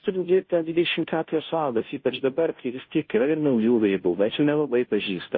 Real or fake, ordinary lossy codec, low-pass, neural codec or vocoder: fake; MP3, 24 kbps; 7.2 kHz; codec, 16 kHz, 0.5 kbps, FunCodec, trained on Chinese and English, 25 frames a second